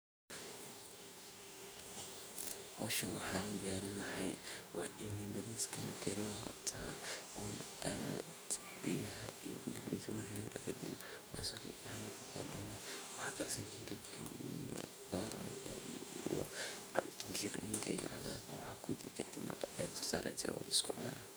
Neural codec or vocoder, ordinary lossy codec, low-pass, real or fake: codec, 44.1 kHz, 2.6 kbps, DAC; none; none; fake